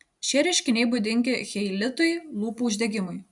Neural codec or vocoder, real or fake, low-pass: none; real; 10.8 kHz